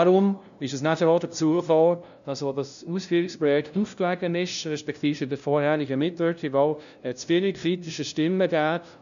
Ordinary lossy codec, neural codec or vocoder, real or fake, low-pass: none; codec, 16 kHz, 0.5 kbps, FunCodec, trained on LibriTTS, 25 frames a second; fake; 7.2 kHz